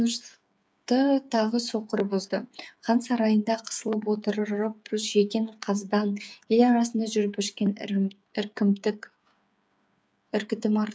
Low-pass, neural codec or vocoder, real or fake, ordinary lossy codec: none; codec, 16 kHz, 8 kbps, FreqCodec, smaller model; fake; none